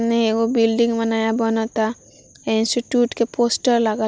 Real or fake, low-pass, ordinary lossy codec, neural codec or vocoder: real; none; none; none